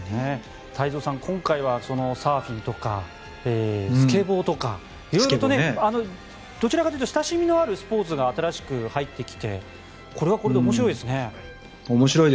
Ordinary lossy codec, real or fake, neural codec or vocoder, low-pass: none; real; none; none